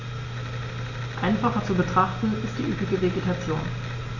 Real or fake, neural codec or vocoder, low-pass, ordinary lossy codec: real; none; 7.2 kHz; none